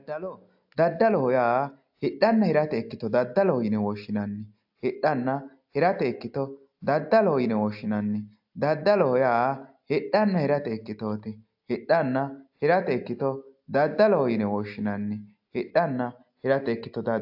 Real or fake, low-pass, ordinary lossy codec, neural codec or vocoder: real; 5.4 kHz; AAC, 48 kbps; none